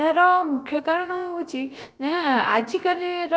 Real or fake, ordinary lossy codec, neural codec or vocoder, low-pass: fake; none; codec, 16 kHz, about 1 kbps, DyCAST, with the encoder's durations; none